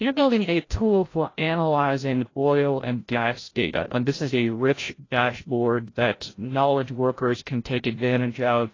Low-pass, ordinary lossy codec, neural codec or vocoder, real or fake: 7.2 kHz; AAC, 32 kbps; codec, 16 kHz, 0.5 kbps, FreqCodec, larger model; fake